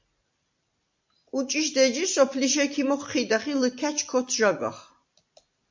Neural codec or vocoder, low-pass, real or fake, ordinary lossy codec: none; 7.2 kHz; real; MP3, 48 kbps